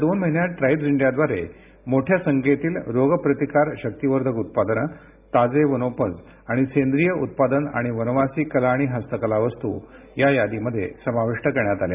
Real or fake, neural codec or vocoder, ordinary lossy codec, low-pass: real; none; none; 3.6 kHz